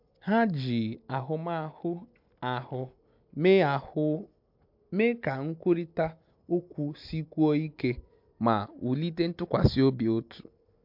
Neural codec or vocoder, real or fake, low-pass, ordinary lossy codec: none; real; 5.4 kHz; MP3, 48 kbps